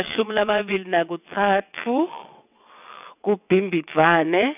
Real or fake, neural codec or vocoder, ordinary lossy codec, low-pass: fake; vocoder, 22.05 kHz, 80 mel bands, WaveNeXt; none; 3.6 kHz